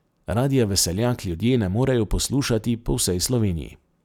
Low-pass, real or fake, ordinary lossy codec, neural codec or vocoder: 19.8 kHz; real; none; none